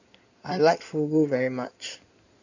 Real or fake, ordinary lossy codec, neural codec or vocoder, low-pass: fake; none; codec, 16 kHz in and 24 kHz out, 2.2 kbps, FireRedTTS-2 codec; 7.2 kHz